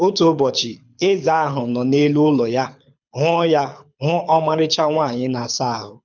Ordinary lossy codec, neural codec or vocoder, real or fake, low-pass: none; codec, 24 kHz, 6 kbps, HILCodec; fake; 7.2 kHz